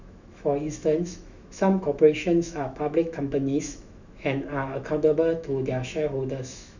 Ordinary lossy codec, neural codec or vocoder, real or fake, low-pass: none; autoencoder, 48 kHz, 128 numbers a frame, DAC-VAE, trained on Japanese speech; fake; 7.2 kHz